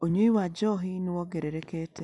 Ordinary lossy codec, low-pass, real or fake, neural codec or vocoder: none; 10.8 kHz; real; none